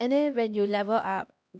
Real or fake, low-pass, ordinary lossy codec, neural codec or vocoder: fake; none; none; codec, 16 kHz, 1 kbps, X-Codec, HuBERT features, trained on LibriSpeech